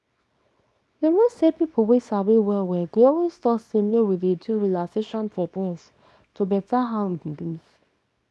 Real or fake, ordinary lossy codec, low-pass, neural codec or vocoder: fake; none; none; codec, 24 kHz, 0.9 kbps, WavTokenizer, small release